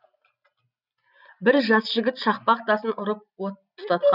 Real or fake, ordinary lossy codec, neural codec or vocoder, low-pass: real; none; none; 5.4 kHz